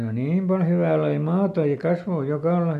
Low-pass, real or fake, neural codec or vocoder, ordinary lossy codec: 14.4 kHz; real; none; MP3, 96 kbps